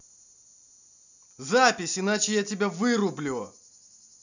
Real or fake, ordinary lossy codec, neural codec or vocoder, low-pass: real; none; none; 7.2 kHz